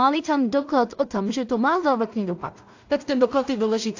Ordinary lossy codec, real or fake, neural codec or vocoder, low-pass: AAC, 48 kbps; fake; codec, 16 kHz in and 24 kHz out, 0.4 kbps, LongCat-Audio-Codec, two codebook decoder; 7.2 kHz